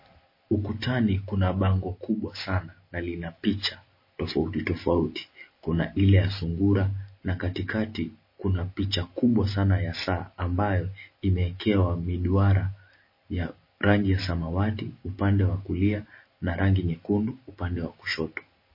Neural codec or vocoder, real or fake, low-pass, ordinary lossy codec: none; real; 5.4 kHz; MP3, 24 kbps